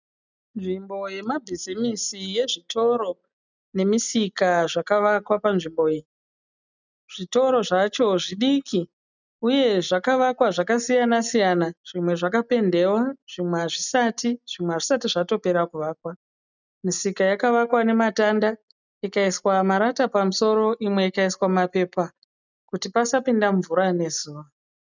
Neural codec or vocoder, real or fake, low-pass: none; real; 7.2 kHz